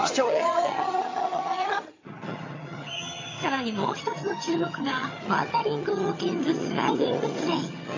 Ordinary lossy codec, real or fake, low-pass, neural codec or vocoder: AAC, 32 kbps; fake; 7.2 kHz; vocoder, 22.05 kHz, 80 mel bands, HiFi-GAN